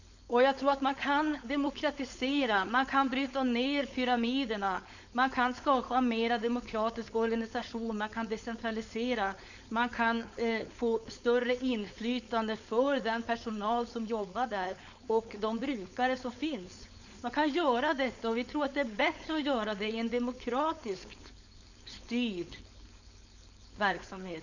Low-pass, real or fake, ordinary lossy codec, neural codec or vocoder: 7.2 kHz; fake; none; codec, 16 kHz, 4.8 kbps, FACodec